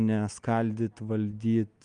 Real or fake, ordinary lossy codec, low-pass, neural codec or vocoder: real; Opus, 32 kbps; 9.9 kHz; none